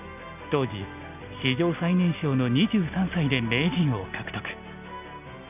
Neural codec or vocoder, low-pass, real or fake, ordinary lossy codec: none; 3.6 kHz; real; none